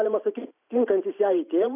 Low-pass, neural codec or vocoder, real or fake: 3.6 kHz; vocoder, 44.1 kHz, 128 mel bands every 512 samples, BigVGAN v2; fake